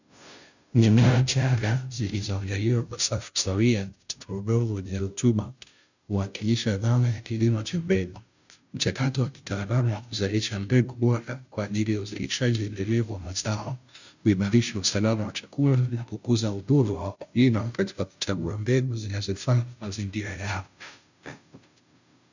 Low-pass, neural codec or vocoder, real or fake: 7.2 kHz; codec, 16 kHz, 0.5 kbps, FunCodec, trained on Chinese and English, 25 frames a second; fake